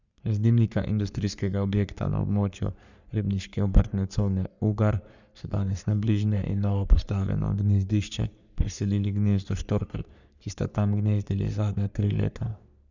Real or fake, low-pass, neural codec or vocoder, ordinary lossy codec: fake; 7.2 kHz; codec, 44.1 kHz, 3.4 kbps, Pupu-Codec; none